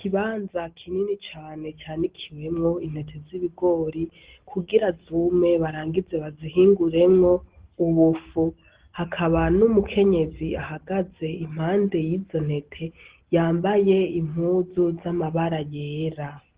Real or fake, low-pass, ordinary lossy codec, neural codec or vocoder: real; 3.6 kHz; Opus, 16 kbps; none